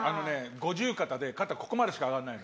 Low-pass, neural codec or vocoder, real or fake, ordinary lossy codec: none; none; real; none